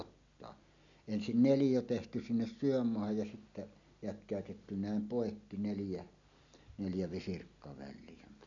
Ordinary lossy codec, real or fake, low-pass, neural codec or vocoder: none; real; 7.2 kHz; none